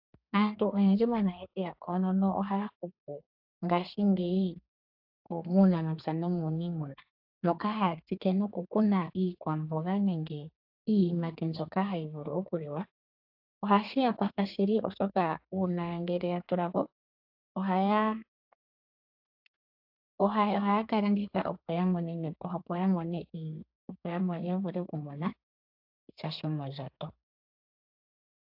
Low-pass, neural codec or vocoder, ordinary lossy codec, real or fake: 5.4 kHz; codec, 32 kHz, 1.9 kbps, SNAC; AAC, 48 kbps; fake